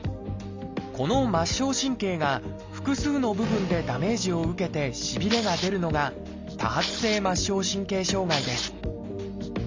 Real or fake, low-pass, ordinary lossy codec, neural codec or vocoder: real; 7.2 kHz; MP3, 64 kbps; none